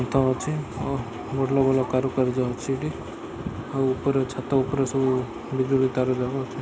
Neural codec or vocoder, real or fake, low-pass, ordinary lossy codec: none; real; none; none